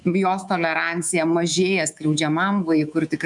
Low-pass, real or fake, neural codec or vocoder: 10.8 kHz; fake; codec, 24 kHz, 3.1 kbps, DualCodec